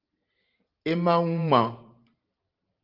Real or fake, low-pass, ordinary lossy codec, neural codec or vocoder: fake; 5.4 kHz; Opus, 24 kbps; vocoder, 44.1 kHz, 128 mel bands every 512 samples, BigVGAN v2